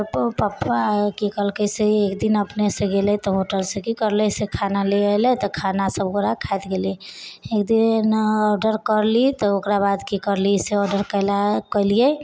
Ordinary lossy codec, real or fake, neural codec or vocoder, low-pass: none; real; none; none